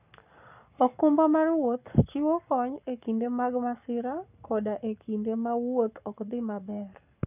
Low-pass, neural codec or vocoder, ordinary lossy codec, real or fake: 3.6 kHz; codec, 44.1 kHz, 7.8 kbps, Pupu-Codec; none; fake